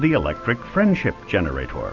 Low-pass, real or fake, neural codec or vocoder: 7.2 kHz; real; none